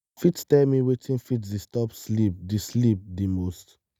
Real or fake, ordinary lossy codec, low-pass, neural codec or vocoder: real; none; none; none